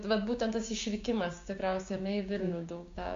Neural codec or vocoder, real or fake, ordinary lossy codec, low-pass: codec, 16 kHz, 6 kbps, DAC; fake; MP3, 48 kbps; 7.2 kHz